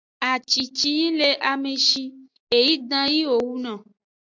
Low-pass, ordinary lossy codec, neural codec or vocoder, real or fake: 7.2 kHz; AAC, 48 kbps; none; real